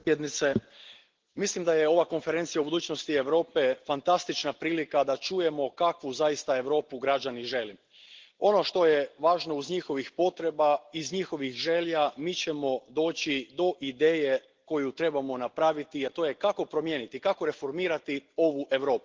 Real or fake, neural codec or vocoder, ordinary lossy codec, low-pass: real; none; Opus, 16 kbps; 7.2 kHz